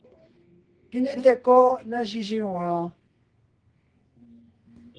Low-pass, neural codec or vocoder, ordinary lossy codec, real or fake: 9.9 kHz; codec, 24 kHz, 0.9 kbps, WavTokenizer, medium music audio release; Opus, 16 kbps; fake